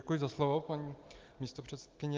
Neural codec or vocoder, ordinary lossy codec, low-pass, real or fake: none; Opus, 24 kbps; 7.2 kHz; real